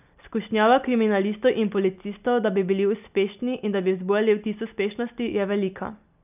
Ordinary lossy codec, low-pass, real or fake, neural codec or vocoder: none; 3.6 kHz; real; none